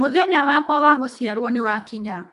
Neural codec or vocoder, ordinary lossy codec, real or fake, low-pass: codec, 24 kHz, 1.5 kbps, HILCodec; none; fake; 10.8 kHz